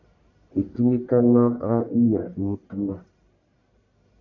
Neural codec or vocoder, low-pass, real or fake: codec, 44.1 kHz, 1.7 kbps, Pupu-Codec; 7.2 kHz; fake